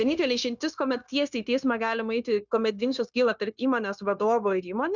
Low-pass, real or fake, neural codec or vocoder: 7.2 kHz; fake; codec, 16 kHz, 0.9 kbps, LongCat-Audio-Codec